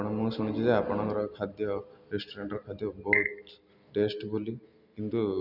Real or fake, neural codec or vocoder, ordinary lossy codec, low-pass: real; none; none; 5.4 kHz